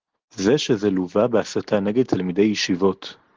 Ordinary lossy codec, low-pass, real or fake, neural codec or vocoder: Opus, 16 kbps; 7.2 kHz; real; none